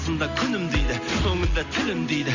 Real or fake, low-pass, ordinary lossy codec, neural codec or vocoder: real; 7.2 kHz; AAC, 48 kbps; none